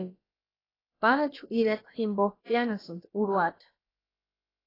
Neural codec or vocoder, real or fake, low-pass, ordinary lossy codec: codec, 16 kHz, about 1 kbps, DyCAST, with the encoder's durations; fake; 5.4 kHz; AAC, 24 kbps